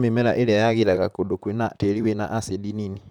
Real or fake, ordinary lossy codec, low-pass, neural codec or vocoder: fake; none; 19.8 kHz; vocoder, 44.1 kHz, 128 mel bands, Pupu-Vocoder